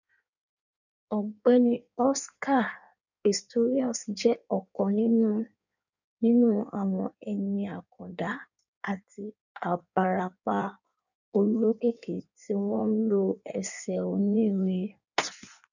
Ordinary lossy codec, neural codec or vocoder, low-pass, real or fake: none; codec, 16 kHz in and 24 kHz out, 1.1 kbps, FireRedTTS-2 codec; 7.2 kHz; fake